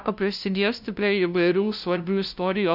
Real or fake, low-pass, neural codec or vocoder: fake; 5.4 kHz; codec, 16 kHz, 0.5 kbps, FunCodec, trained on LibriTTS, 25 frames a second